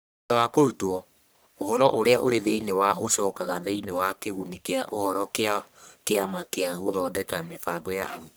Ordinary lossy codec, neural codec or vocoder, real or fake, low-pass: none; codec, 44.1 kHz, 1.7 kbps, Pupu-Codec; fake; none